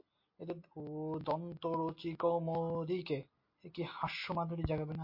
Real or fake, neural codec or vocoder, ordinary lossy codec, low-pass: real; none; MP3, 32 kbps; 5.4 kHz